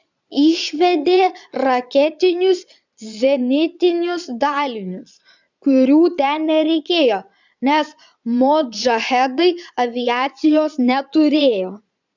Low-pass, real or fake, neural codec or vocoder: 7.2 kHz; fake; vocoder, 22.05 kHz, 80 mel bands, Vocos